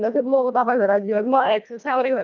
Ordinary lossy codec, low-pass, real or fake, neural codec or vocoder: none; 7.2 kHz; fake; codec, 24 kHz, 1.5 kbps, HILCodec